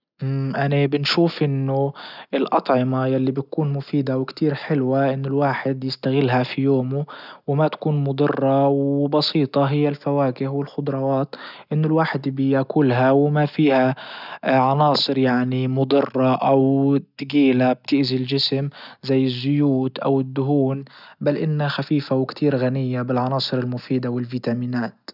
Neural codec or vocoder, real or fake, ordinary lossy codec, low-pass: none; real; none; 5.4 kHz